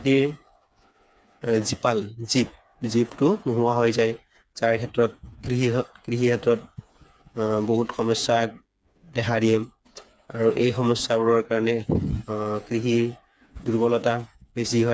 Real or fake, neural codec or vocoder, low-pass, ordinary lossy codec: fake; codec, 16 kHz, 4 kbps, FreqCodec, smaller model; none; none